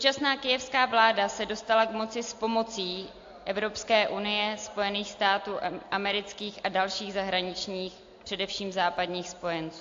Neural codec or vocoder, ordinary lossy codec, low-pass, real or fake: none; AAC, 48 kbps; 7.2 kHz; real